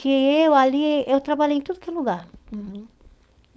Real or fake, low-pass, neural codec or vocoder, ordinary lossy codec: fake; none; codec, 16 kHz, 4.8 kbps, FACodec; none